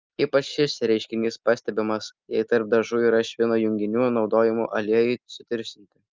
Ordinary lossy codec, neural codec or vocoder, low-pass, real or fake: Opus, 24 kbps; none; 7.2 kHz; real